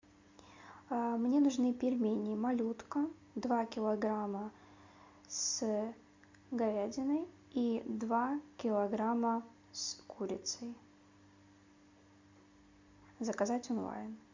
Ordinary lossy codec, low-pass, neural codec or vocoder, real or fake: MP3, 48 kbps; 7.2 kHz; none; real